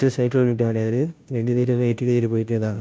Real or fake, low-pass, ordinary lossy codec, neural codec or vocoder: fake; none; none; codec, 16 kHz, 0.5 kbps, FunCodec, trained on Chinese and English, 25 frames a second